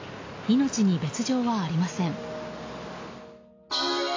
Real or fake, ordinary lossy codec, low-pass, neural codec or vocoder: real; AAC, 32 kbps; 7.2 kHz; none